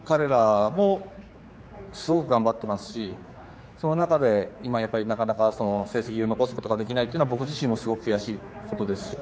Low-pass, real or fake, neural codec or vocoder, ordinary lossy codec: none; fake; codec, 16 kHz, 4 kbps, X-Codec, HuBERT features, trained on general audio; none